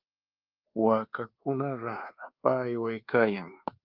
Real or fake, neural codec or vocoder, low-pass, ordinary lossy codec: fake; codec, 24 kHz, 1.2 kbps, DualCodec; 5.4 kHz; Opus, 32 kbps